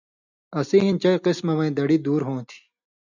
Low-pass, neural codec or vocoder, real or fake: 7.2 kHz; none; real